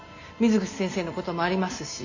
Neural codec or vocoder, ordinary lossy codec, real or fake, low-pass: none; AAC, 48 kbps; real; 7.2 kHz